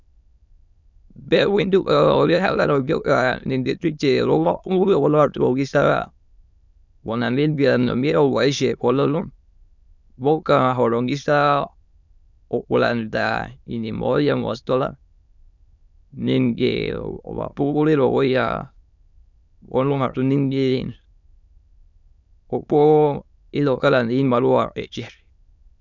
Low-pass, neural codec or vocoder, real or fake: 7.2 kHz; autoencoder, 22.05 kHz, a latent of 192 numbers a frame, VITS, trained on many speakers; fake